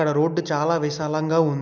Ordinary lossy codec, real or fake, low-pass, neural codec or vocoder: none; real; 7.2 kHz; none